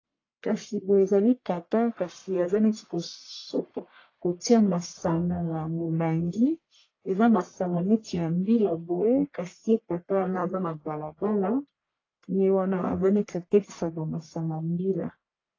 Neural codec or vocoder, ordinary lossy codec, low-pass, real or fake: codec, 44.1 kHz, 1.7 kbps, Pupu-Codec; AAC, 32 kbps; 7.2 kHz; fake